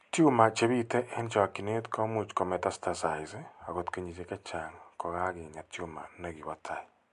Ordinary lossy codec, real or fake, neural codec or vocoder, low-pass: MP3, 64 kbps; real; none; 10.8 kHz